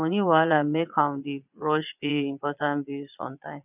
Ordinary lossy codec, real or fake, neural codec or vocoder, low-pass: none; fake; vocoder, 22.05 kHz, 80 mel bands, Vocos; 3.6 kHz